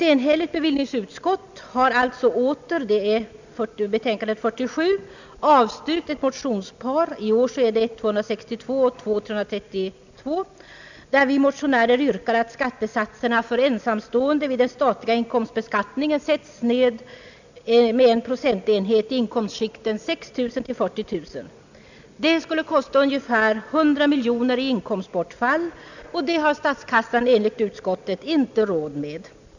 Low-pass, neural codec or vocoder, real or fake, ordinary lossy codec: 7.2 kHz; none; real; none